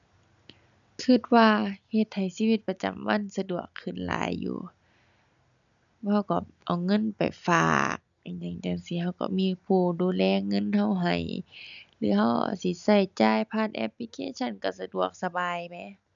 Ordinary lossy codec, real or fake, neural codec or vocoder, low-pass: none; real; none; 7.2 kHz